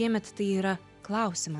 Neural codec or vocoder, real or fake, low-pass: none; real; 10.8 kHz